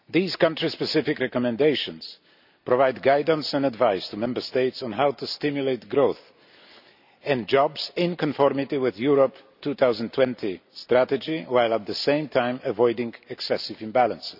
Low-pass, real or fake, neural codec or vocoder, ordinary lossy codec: 5.4 kHz; real; none; none